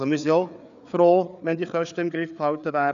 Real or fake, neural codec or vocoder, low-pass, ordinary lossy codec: fake; codec, 16 kHz, 4 kbps, FreqCodec, larger model; 7.2 kHz; none